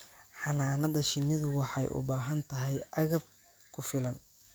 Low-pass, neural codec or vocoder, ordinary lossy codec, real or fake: none; codec, 44.1 kHz, 7.8 kbps, DAC; none; fake